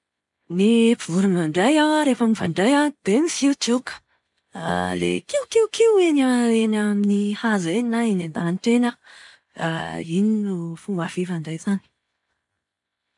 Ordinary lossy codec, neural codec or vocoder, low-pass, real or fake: none; none; 10.8 kHz; real